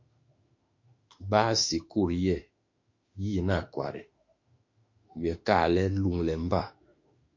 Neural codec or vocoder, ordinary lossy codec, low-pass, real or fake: autoencoder, 48 kHz, 32 numbers a frame, DAC-VAE, trained on Japanese speech; MP3, 48 kbps; 7.2 kHz; fake